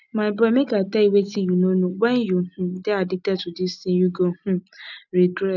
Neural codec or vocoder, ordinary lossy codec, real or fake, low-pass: none; none; real; 7.2 kHz